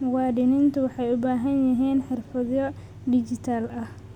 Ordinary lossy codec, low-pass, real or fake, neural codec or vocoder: none; 19.8 kHz; real; none